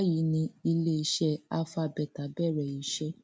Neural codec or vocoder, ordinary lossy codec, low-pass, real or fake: none; none; none; real